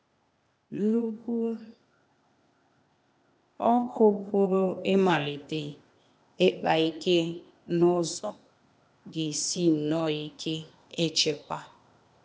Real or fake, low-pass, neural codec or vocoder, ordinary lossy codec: fake; none; codec, 16 kHz, 0.8 kbps, ZipCodec; none